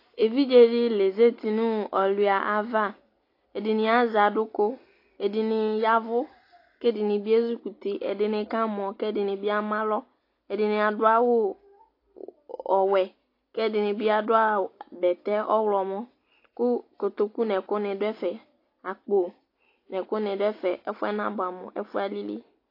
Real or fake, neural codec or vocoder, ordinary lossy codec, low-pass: real; none; AAC, 32 kbps; 5.4 kHz